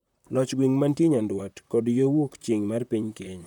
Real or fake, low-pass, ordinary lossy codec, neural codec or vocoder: fake; 19.8 kHz; none; vocoder, 44.1 kHz, 128 mel bands, Pupu-Vocoder